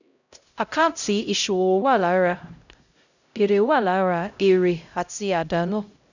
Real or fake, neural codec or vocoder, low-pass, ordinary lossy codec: fake; codec, 16 kHz, 0.5 kbps, X-Codec, HuBERT features, trained on LibriSpeech; 7.2 kHz; none